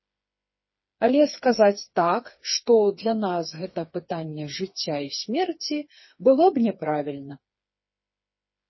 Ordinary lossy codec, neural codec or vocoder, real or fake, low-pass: MP3, 24 kbps; codec, 16 kHz, 4 kbps, FreqCodec, smaller model; fake; 7.2 kHz